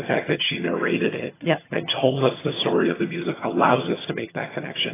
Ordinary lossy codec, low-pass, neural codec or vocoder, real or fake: AAC, 16 kbps; 3.6 kHz; vocoder, 22.05 kHz, 80 mel bands, HiFi-GAN; fake